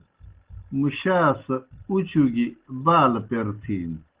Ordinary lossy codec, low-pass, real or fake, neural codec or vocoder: Opus, 16 kbps; 3.6 kHz; real; none